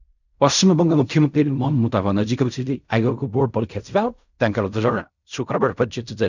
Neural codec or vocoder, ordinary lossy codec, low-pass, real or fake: codec, 16 kHz in and 24 kHz out, 0.4 kbps, LongCat-Audio-Codec, fine tuned four codebook decoder; none; 7.2 kHz; fake